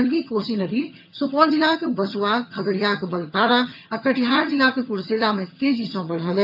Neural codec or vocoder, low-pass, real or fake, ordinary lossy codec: vocoder, 22.05 kHz, 80 mel bands, HiFi-GAN; 5.4 kHz; fake; AAC, 48 kbps